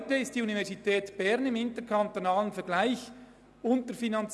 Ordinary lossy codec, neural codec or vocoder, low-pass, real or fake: none; none; none; real